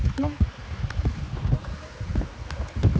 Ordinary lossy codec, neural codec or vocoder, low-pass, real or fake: none; codec, 16 kHz, 4 kbps, X-Codec, HuBERT features, trained on general audio; none; fake